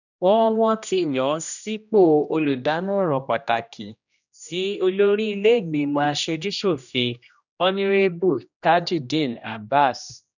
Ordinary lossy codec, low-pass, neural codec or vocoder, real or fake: none; 7.2 kHz; codec, 16 kHz, 1 kbps, X-Codec, HuBERT features, trained on general audio; fake